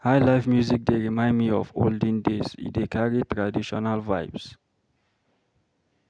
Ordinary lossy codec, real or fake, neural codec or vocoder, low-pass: none; real; none; 9.9 kHz